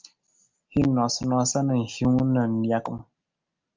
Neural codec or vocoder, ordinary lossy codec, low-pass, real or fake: none; Opus, 24 kbps; 7.2 kHz; real